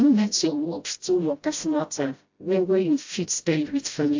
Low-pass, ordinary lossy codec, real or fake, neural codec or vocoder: 7.2 kHz; AAC, 48 kbps; fake; codec, 16 kHz, 0.5 kbps, FreqCodec, smaller model